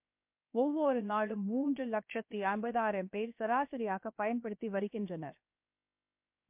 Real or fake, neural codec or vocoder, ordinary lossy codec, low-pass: fake; codec, 16 kHz, 0.7 kbps, FocalCodec; MP3, 32 kbps; 3.6 kHz